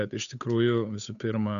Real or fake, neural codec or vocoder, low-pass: fake; codec, 16 kHz, 8 kbps, FunCodec, trained on Chinese and English, 25 frames a second; 7.2 kHz